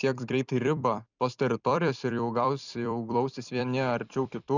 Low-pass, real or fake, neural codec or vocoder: 7.2 kHz; fake; vocoder, 44.1 kHz, 128 mel bands every 256 samples, BigVGAN v2